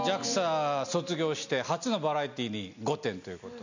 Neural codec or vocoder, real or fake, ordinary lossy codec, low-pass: none; real; none; 7.2 kHz